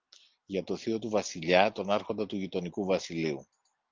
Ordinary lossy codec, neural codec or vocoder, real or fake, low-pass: Opus, 32 kbps; none; real; 7.2 kHz